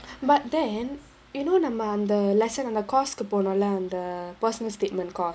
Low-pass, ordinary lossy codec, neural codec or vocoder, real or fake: none; none; none; real